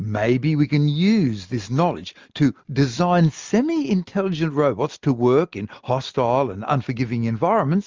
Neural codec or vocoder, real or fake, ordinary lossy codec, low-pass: none; real; Opus, 32 kbps; 7.2 kHz